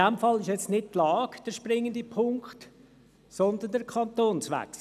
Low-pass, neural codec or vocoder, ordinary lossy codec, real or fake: 14.4 kHz; none; none; real